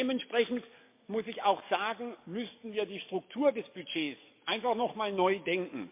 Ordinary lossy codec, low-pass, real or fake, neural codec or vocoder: MP3, 24 kbps; 3.6 kHz; fake; codec, 44.1 kHz, 7.8 kbps, DAC